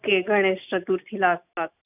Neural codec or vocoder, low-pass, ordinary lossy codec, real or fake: none; 3.6 kHz; none; real